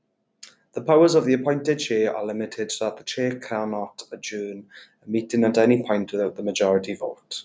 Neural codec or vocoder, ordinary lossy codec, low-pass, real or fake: none; none; none; real